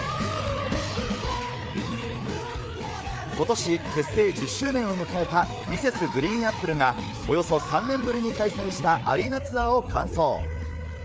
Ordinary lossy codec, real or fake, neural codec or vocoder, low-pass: none; fake; codec, 16 kHz, 4 kbps, FreqCodec, larger model; none